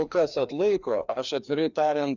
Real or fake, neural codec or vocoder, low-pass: fake; codec, 16 kHz, 2 kbps, FreqCodec, larger model; 7.2 kHz